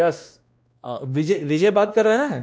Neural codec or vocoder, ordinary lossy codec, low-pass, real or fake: codec, 16 kHz, 1 kbps, X-Codec, WavLM features, trained on Multilingual LibriSpeech; none; none; fake